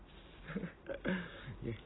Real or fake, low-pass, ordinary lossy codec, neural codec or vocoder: real; 7.2 kHz; AAC, 16 kbps; none